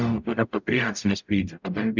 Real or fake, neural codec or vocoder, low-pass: fake; codec, 44.1 kHz, 0.9 kbps, DAC; 7.2 kHz